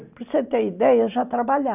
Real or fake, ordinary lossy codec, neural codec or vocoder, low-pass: real; none; none; 3.6 kHz